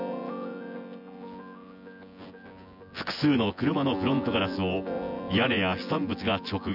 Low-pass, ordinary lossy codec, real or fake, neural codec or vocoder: 5.4 kHz; none; fake; vocoder, 24 kHz, 100 mel bands, Vocos